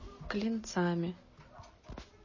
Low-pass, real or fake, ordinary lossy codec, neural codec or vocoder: 7.2 kHz; real; MP3, 32 kbps; none